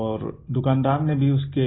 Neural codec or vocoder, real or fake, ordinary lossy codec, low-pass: none; real; AAC, 16 kbps; 7.2 kHz